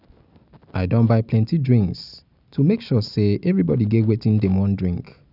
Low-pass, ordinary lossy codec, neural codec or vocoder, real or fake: 5.4 kHz; none; none; real